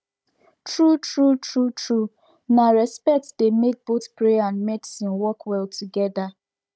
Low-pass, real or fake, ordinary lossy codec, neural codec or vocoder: none; fake; none; codec, 16 kHz, 16 kbps, FunCodec, trained on Chinese and English, 50 frames a second